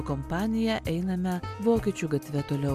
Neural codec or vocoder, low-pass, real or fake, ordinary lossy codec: none; 14.4 kHz; real; MP3, 96 kbps